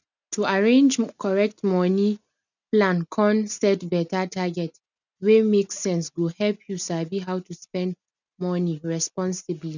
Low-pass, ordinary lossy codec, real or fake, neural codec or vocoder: 7.2 kHz; none; real; none